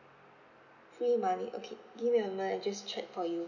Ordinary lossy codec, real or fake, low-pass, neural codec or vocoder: none; real; 7.2 kHz; none